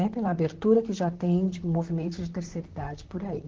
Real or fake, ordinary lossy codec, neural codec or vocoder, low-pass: fake; Opus, 16 kbps; vocoder, 44.1 kHz, 128 mel bands, Pupu-Vocoder; 7.2 kHz